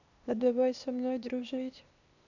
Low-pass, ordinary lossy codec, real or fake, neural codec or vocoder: 7.2 kHz; none; fake; codec, 16 kHz, 0.8 kbps, ZipCodec